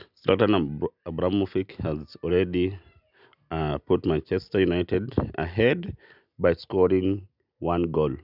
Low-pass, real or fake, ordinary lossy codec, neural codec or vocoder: 5.4 kHz; real; none; none